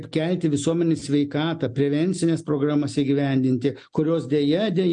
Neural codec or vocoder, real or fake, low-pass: none; real; 9.9 kHz